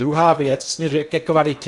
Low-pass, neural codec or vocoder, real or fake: 10.8 kHz; codec, 16 kHz in and 24 kHz out, 0.8 kbps, FocalCodec, streaming, 65536 codes; fake